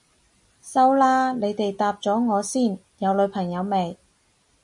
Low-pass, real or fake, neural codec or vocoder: 10.8 kHz; real; none